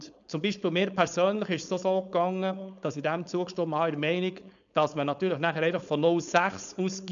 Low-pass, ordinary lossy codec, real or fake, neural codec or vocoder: 7.2 kHz; none; fake; codec, 16 kHz, 4.8 kbps, FACodec